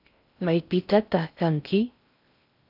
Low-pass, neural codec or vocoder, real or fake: 5.4 kHz; codec, 16 kHz in and 24 kHz out, 0.6 kbps, FocalCodec, streaming, 4096 codes; fake